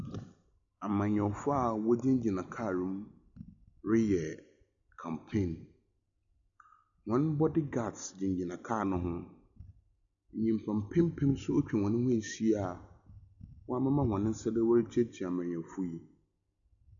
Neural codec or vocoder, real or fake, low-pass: none; real; 7.2 kHz